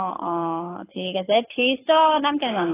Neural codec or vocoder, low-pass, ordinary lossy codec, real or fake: codec, 24 kHz, 6 kbps, HILCodec; 3.6 kHz; AAC, 16 kbps; fake